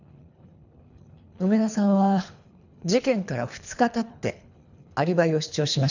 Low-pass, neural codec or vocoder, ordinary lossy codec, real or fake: 7.2 kHz; codec, 24 kHz, 3 kbps, HILCodec; none; fake